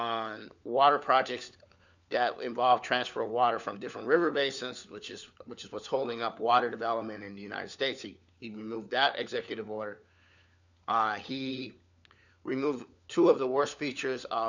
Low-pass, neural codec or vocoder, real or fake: 7.2 kHz; codec, 16 kHz, 4 kbps, FunCodec, trained on LibriTTS, 50 frames a second; fake